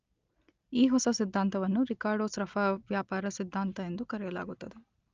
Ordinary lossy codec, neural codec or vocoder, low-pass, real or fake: Opus, 24 kbps; none; 7.2 kHz; real